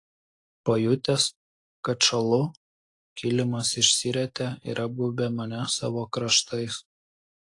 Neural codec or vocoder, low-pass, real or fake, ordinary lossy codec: none; 10.8 kHz; real; AAC, 48 kbps